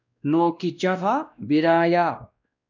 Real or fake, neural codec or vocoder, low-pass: fake; codec, 16 kHz, 1 kbps, X-Codec, WavLM features, trained on Multilingual LibriSpeech; 7.2 kHz